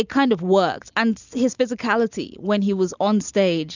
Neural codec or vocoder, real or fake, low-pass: none; real; 7.2 kHz